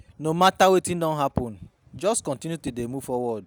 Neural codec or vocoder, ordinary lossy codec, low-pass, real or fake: none; none; none; real